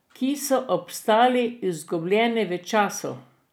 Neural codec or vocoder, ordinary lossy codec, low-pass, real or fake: none; none; none; real